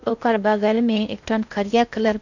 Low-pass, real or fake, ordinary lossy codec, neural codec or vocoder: 7.2 kHz; fake; none; codec, 16 kHz in and 24 kHz out, 0.6 kbps, FocalCodec, streaming, 4096 codes